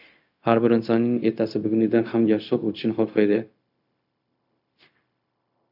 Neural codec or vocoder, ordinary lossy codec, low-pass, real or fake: codec, 16 kHz, 0.4 kbps, LongCat-Audio-Codec; AAC, 48 kbps; 5.4 kHz; fake